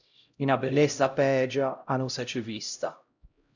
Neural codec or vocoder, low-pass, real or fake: codec, 16 kHz, 0.5 kbps, X-Codec, HuBERT features, trained on LibriSpeech; 7.2 kHz; fake